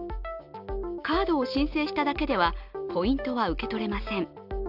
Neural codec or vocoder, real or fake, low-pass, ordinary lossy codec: none; real; 5.4 kHz; none